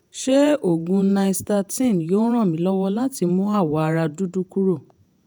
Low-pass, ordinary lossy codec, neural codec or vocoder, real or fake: none; none; vocoder, 48 kHz, 128 mel bands, Vocos; fake